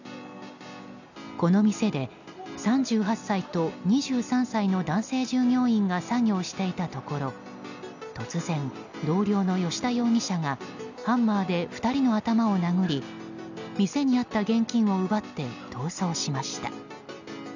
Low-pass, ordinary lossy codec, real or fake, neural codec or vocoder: 7.2 kHz; none; real; none